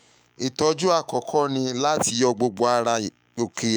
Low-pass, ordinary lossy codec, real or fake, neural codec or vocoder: none; none; fake; autoencoder, 48 kHz, 128 numbers a frame, DAC-VAE, trained on Japanese speech